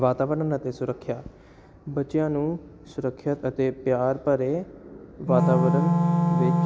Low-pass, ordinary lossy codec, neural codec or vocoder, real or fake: none; none; none; real